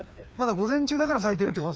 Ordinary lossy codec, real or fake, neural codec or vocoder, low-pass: none; fake; codec, 16 kHz, 2 kbps, FreqCodec, larger model; none